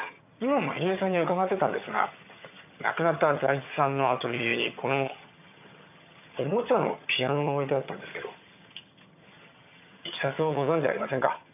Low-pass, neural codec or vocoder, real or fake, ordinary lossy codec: 3.6 kHz; vocoder, 22.05 kHz, 80 mel bands, HiFi-GAN; fake; none